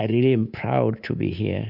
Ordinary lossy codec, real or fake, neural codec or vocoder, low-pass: Opus, 64 kbps; fake; codec, 24 kHz, 3.1 kbps, DualCodec; 5.4 kHz